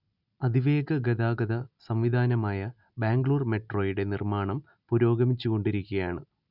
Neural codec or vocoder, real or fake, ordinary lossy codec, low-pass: none; real; none; 5.4 kHz